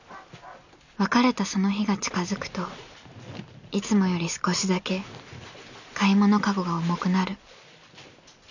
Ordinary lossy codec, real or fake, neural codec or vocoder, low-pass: none; real; none; 7.2 kHz